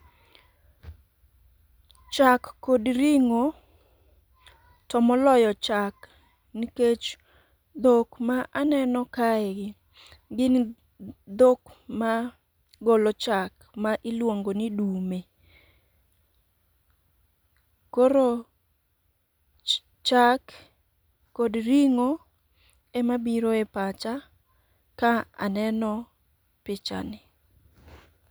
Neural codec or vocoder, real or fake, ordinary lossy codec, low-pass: none; real; none; none